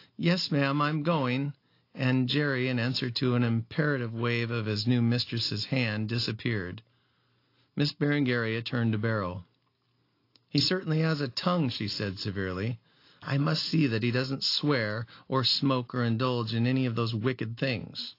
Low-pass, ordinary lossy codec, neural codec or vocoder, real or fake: 5.4 kHz; AAC, 32 kbps; none; real